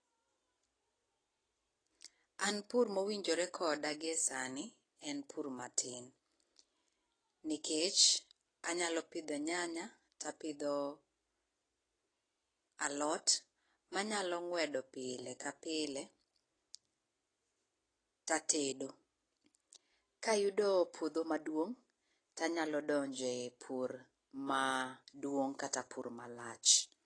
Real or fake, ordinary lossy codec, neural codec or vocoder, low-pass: real; AAC, 32 kbps; none; 9.9 kHz